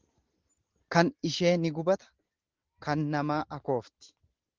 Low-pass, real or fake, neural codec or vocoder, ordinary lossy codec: 7.2 kHz; real; none; Opus, 16 kbps